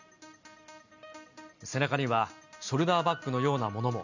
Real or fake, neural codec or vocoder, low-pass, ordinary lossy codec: real; none; 7.2 kHz; none